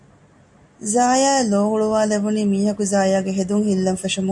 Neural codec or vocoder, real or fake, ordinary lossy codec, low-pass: none; real; AAC, 64 kbps; 10.8 kHz